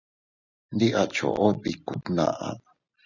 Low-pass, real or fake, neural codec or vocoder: 7.2 kHz; real; none